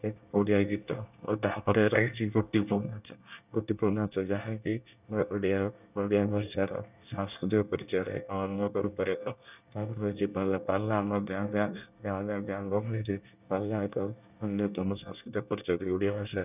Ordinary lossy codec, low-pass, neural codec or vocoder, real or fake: none; 3.6 kHz; codec, 24 kHz, 1 kbps, SNAC; fake